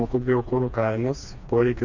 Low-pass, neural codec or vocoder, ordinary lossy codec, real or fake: 7.2 kHz; codec, 16 kHz, 2 kbps, FreqCodec, smaller model; Opus, 64 kbps; fake